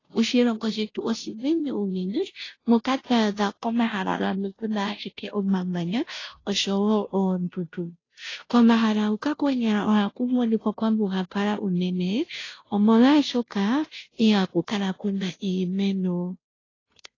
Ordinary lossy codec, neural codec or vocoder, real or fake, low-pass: AAC, 32 kbps; codec, 16 kHz, 0.5 kbps, FunCodec, trained on Chinese and English, 25 frames a second; fake; 7.2 kHz